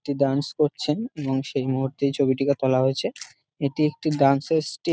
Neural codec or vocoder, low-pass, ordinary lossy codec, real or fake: none; none; none; real